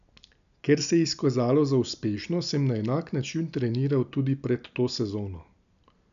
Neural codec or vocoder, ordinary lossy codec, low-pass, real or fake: none; AAC, 96 kbps; 7.2 kHz; real